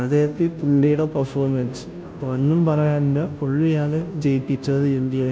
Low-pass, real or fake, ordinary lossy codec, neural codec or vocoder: none; fake; none; codec, 16 kHz, 0.5 kbps, FunCodec, trained on Chinese and English, 25 frames a second